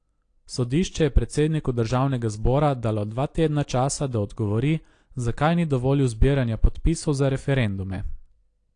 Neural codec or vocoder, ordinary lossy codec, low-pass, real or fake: none; AAC, 48 kbps; 9.9 kHz; real